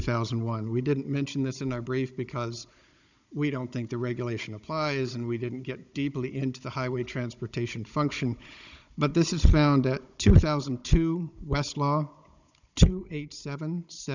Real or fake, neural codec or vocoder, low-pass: fake; codec, 16 kHz, 16 kbps, FunCodec, trained on Chinese and English, 50 frames a second; 7.2 kHz